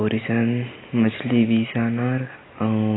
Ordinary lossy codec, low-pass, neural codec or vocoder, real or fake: AAC, 16 kbps; 7.2 kHz; none; real